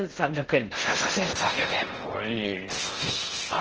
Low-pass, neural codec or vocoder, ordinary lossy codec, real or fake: 7.2 kHz; codec, 16 kHz in and 24 kHz out, 0.6 kbps, FocalCodec, streaming, 4096 codes; Opus, 16 kbps; fake